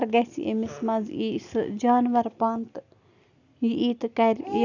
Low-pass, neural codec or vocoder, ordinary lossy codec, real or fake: 7.2 kHz; none; none; real